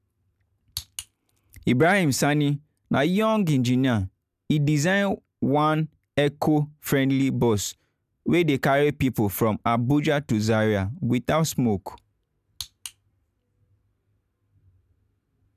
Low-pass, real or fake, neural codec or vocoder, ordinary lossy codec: 14.4 kHz; real; none; none